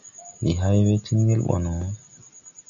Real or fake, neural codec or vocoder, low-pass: real; none; 7.2 kHz